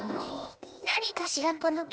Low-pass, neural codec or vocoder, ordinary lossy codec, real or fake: none; codec, 16 kHz, 0.8 kbps, ZipCodec; none; fake